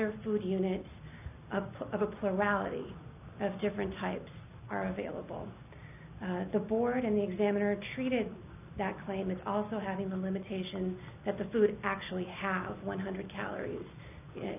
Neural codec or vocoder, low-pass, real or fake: none; 3.6 kHz; real